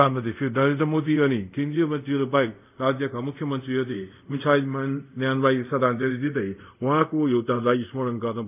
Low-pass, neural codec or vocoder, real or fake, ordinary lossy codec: 3.6 kHz; codec, 24 kHz, 0.5 kbps, DualCodec; fake; none